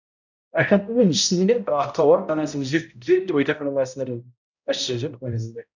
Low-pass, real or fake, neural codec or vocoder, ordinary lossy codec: 7.2 kHz; fake; codec, 16 kHz, 0.5 kbps, X-Codec, HuBERT features, trained on balanced general audio; none